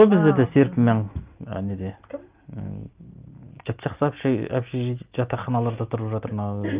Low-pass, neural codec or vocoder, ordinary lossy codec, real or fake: 3.6 kHz; none; Opus, 24 kbps; real